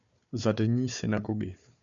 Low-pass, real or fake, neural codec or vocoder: 7.2 kHz; fake; codec, 16 kHz, 4 kbps, FunCodec, trained on Chinese and English, 50 frames a second